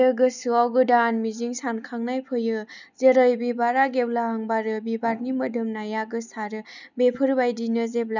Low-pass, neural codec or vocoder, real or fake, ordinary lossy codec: 7.2 kHz; none; real; none